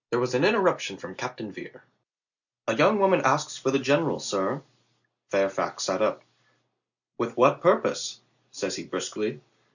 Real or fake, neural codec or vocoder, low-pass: real; none; 7.2 kHz